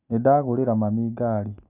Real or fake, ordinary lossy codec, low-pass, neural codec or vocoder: real; none; 3.6 kHz; none